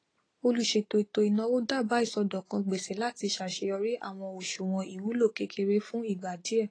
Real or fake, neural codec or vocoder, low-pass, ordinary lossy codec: real; none; 9.9 kHz; AAC, 32 kbps